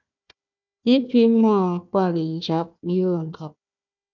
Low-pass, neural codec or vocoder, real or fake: 7.2 kHz; codec, 16 kHz, 1 kbps, FunCodec, trained on Chinese and English, 50 frames a second; fake